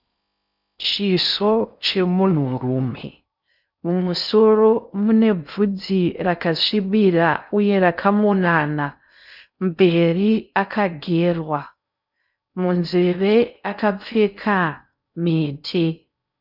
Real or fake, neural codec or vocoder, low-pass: fake; codec, 16 kHz in and 24 kHz out, 0.6 kbps, FocalCodec, streaming, 4096 codes; 5.4 kHz